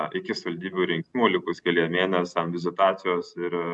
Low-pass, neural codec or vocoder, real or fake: 10.8 kHz; none; real